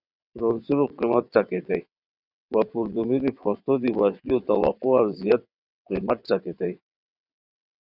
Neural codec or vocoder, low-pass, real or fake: vocoder, 22.05 kHz, 80 mel bands, WaveNeXt; 5.4 kHz; fake